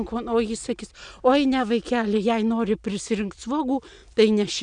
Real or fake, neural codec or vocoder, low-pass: real; none; 9.9 kHz